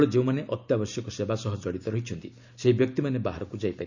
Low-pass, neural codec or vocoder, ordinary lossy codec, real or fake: 7.2 kHz; none; none; real